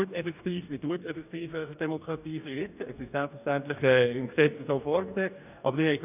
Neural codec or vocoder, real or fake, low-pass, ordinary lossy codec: codec, 44.1 kHz, 2.6 kbps, DAC; fake; 3.6 kHz; none